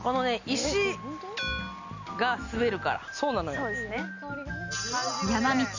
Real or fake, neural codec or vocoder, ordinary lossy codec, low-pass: real; none; none; 7.2 kHz